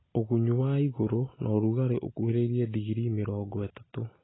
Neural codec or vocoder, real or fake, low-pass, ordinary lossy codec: none; real; 7.2 kHz; AAC, 16 kbps